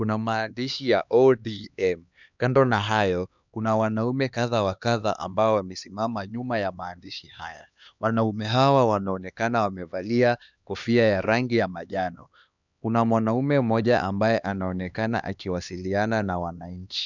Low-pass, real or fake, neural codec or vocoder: 7.2 kHz; fake; codec, 16 kHz, 2 kbps, X-Codec, HuBERT features, trained on LibriSpeech